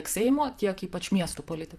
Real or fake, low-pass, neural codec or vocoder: fake; 14.4 kHz; vocoder, 44.1 kHz, 128 mel bands, Pupu-Vocoder